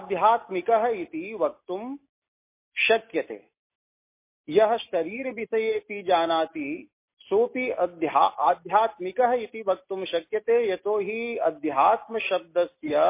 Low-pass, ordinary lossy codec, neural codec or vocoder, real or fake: 3.6 kHz; MP3, 24 kbps; none; real